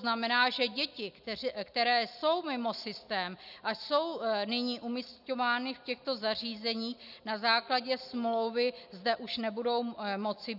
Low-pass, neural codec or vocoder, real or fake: 5.4 kHz; none; real